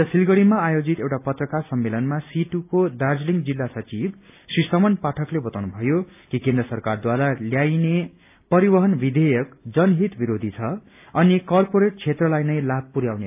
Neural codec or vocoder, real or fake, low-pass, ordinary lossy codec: none; real; 3.6 kHz; AAC, 32 kbps